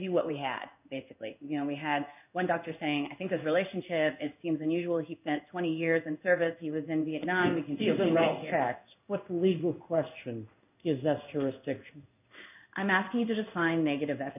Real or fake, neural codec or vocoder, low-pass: fake; codec, 16 kHz in and 24 kHz out, 1 kbps, XY-Tokenizer; 3.6 kHz